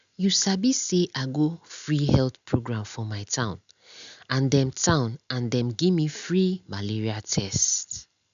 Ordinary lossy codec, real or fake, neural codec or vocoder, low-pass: none; real; none; 7.2 kHz